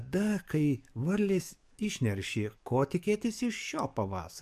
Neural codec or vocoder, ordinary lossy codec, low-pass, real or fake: codec, 44.1 kHz, 7.8 kbps, DAC; MP3, 96 kbps; 14.4 kHz; fake